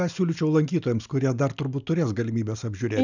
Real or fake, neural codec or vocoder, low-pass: real; none; 7.2 kHz